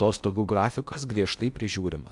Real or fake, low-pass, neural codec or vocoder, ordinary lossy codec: fake; 10.8 kHz; codec, 16 kHz in and 24 kHz out, 0.8 kbps, FocalCodec, streaming, 65536 codes; AAC, 64 kbps